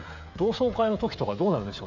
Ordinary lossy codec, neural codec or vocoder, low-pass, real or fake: none; codec, 16 kHz, 16 kbps, FreqCodec, smaller model; 7.2 kHz; fake